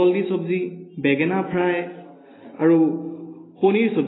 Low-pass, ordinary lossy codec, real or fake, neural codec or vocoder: 7.2 kHz; AAC, 16 kbps; real; none